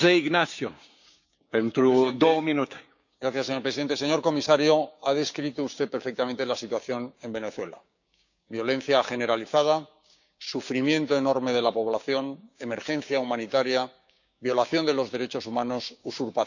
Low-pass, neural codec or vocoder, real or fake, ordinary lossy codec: 7.2 kHz; codec, 16 kHz, 6 kbps, DAC; fake; none